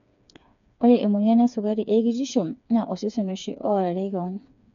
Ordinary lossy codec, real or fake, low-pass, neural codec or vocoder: none; fake; 7.2 kHz; codec, 16 kHz, 4 kbps, FreqCodec, smaller model